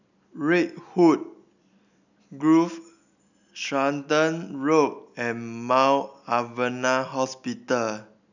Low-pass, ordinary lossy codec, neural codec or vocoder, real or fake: 7.2 kHz; none; none; real